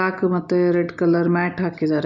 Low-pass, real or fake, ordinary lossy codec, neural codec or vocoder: 7.2 kHz; real; MP3, 64 kbps; none